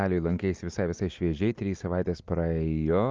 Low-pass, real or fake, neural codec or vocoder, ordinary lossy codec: 7.2 kHz; real; none; Opus, 24 kbps